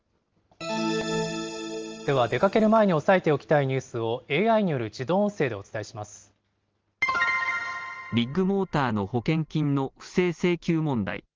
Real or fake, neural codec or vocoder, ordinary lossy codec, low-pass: real; none; Opus, 24 kbps; 7.2 kHz